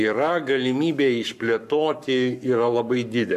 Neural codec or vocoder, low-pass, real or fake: codec, 44.1 kHz, 7.8 kbps, Pupu-Codec; 14.4 kHz; fake